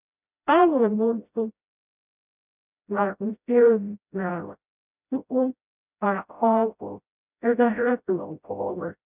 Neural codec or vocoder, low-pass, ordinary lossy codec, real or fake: codec, 16 kHz, 0.5 kbps, FreqCodec, smaller model; 3.6 kHz; none; fake